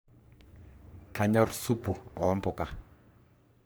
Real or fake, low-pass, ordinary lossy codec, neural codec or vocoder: fake; none; none; codec, 44.1 kHz, 3.4 kbps, Pupu-Codec